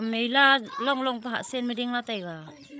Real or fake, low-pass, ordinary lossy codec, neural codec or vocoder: fake; none; none; codec, 16 kHz, 16 kbps, FreqCodec, larger model